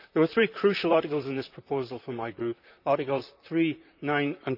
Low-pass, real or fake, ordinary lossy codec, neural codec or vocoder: 5.4 kHz; fake; none; vocoder, 44.1 kHz, 128 mel bands, Pupu-Vocoder